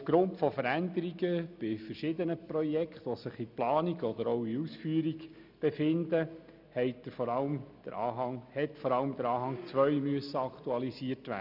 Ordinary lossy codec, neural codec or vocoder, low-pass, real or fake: none; none; 5.4 kHz; real